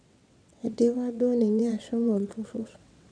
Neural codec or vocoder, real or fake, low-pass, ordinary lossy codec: vocoder, 22.05 kHz, 80 mel bands, WaveNeXt; fake; 9.9 kHz; none